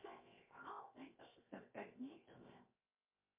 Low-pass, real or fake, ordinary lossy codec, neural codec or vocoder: 3.6 kHz; fake; MP3, 24 kbps; codec, 16 kHz, 0.7 kbps, FocalCodec